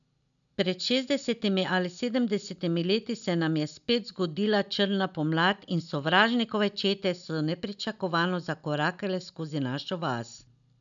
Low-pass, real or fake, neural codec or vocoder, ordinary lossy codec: 7.2 kHz; real; none; none